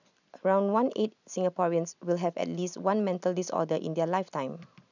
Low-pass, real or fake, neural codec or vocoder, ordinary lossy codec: 7.2 kHz; real; none; none